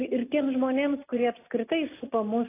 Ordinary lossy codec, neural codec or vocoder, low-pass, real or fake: AAC, 24 kbps; none; 3.6 kHz; real